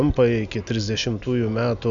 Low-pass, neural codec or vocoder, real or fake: 7.2 kHz; none; real